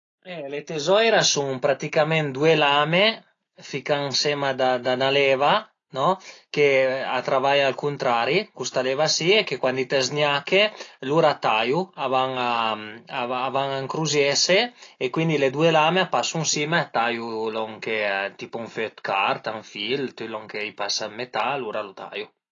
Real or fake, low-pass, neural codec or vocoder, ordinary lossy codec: real; 7.2 kHz; none; AAC, 32 kbps